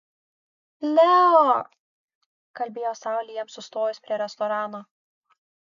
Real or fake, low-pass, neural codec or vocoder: real; 7.2 kHz; none